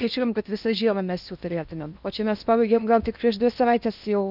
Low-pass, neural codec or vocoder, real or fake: 5.4 kHz; codec, 16 kHz in and 24 kHz out, 0.8 kbps, FocalCodec, streaming, 65536 codes; fake